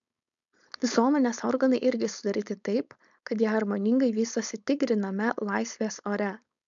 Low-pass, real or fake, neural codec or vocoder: 7.2 kHz; fake; codec, 16 kHz, 4.8 kbps, FACodec